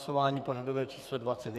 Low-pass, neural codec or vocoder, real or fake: 14.4 kHz; codec, 44.1 kHz, 2.6 kbps, SNAC; fake